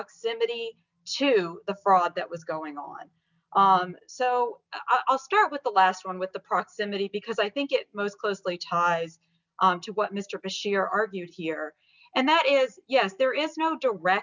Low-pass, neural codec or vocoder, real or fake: 7.2 kHz; none; real